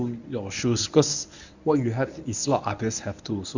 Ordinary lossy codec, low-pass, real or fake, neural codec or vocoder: none; 7.2 kHz; fake; codec, 24 kHz, 0.9 kbps, WavTokenizer, medium speech release version 1